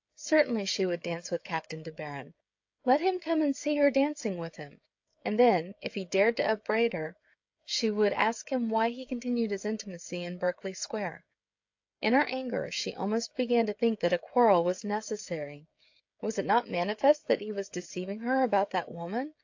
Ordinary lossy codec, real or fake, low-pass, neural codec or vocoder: AAC, 48 kbps; fake; 7.2 kHz; codec, 16 kHz, 16 kbps, FreqCodec, smaller model